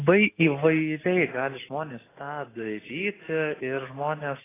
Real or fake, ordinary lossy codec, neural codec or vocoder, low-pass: real; AAC, 16 kbps; none; 3.6 kHz